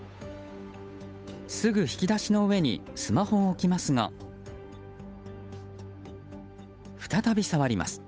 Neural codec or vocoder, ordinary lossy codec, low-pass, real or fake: codec, 16 kHz, 8 kbps, FunCodec, trained on Chinese and English, 25 frames a second; none; none; fake